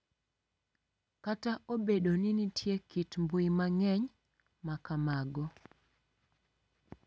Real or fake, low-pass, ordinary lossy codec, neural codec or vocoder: real; none; none; none